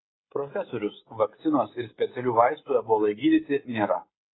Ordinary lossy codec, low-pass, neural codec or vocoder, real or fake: AAC, 16 kbps; 7.2 kHz; codec, 16 kHz, 16 kbps, FreqCodec, smaller model; fake